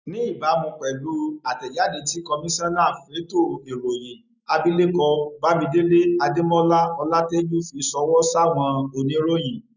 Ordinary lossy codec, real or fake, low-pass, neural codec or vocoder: none; real; 7.2 kHz; none